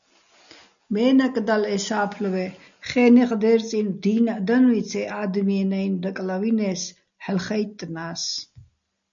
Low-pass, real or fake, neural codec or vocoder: 7.2 kHz; real; none